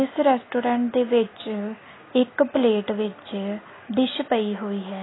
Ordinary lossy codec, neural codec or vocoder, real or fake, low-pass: AAC, 16 kbps; none; real; 7.2 kHz